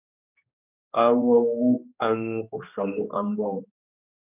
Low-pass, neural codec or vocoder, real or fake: 3.6 kHz; codec, 16 kHz, 1 kbps, X-Codec, HuBERT features, trained on general audio; fake